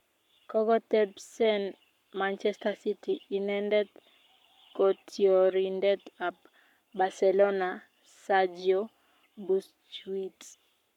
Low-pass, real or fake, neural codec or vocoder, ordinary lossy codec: 19.8 kHz; fake; codec, 44.1 kHz, 7.8 kbps, Pupu-Codec; none